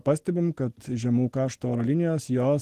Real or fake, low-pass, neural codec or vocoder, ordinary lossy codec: real; 14.4 kHz; none; Opus, 16 kbps